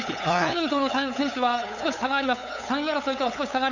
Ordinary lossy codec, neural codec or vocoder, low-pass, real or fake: none; codec, 16 kHz, 8 kbps, FunCodec, trained on LibriTTS, 25 frames a second; 7.2 kHz; fake